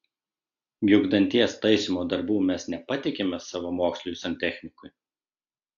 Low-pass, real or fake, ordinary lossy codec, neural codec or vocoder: 7.2 kHz; real; AAC, 64 kbps; none